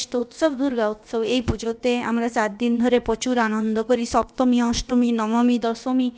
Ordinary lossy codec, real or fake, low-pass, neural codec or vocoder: none; fake; none; codec, 16 kHz, about 1 kbps, DyCAST, with the encoder's durations